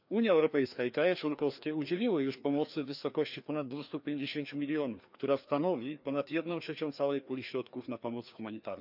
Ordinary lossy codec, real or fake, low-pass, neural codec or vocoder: none; fake; 5.4 kHz; codec, 16 kHz, 2 kbps, FreqCodec, larger model